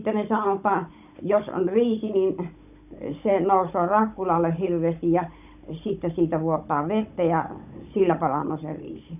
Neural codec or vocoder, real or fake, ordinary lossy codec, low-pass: codec, 16 kHz, 16 kbps, FunCodec, trained on Chinese and English, 50 frames a second; fake; none; 3.6 kHz